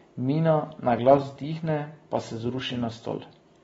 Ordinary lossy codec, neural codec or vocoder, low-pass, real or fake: AAC, 24 kbps; none; 19.8 kHz; real